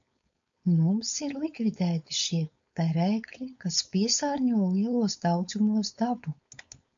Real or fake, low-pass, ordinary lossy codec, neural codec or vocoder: fake; 7.2 kHz; MP3, 64 kbps; codec, 16 kHz, 4.8 kbps, FACodec